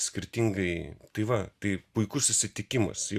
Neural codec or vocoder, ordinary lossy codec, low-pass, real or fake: none; Opus, 64 kbps; 14.4 kHz; real